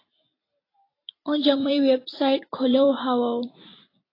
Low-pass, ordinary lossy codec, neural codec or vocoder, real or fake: 5.4 kHz; AAC, 24 kbps; vocoder, 44.1 kHz, 128 mel bands every 256 samples, BigVGAN v2; fake